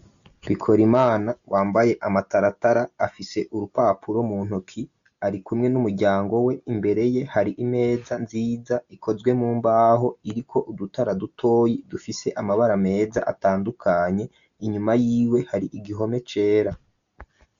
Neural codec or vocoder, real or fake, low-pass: none; real; 7.2 kHz